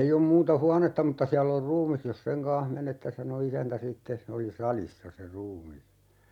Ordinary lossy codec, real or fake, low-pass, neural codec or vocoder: none; real; 19.8 kHz; none